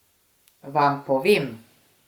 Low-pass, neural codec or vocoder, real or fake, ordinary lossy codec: 19.8 kHz; codec, 44.1 kHz, 7.8 kbps, Pupu-Codec; fake; Opus, 64 kbps